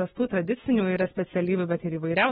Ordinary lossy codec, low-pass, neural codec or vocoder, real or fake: AAC, 16 kbps; 19.8 kHz; autoencoder, 48 kHz, 128 numbers a frame, DAC-VAE, trained on Japanese speech; fake